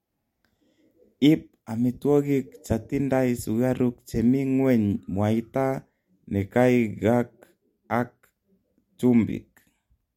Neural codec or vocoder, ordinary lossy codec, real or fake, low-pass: none; MP3, 64 kbps; real; 19.8 kHz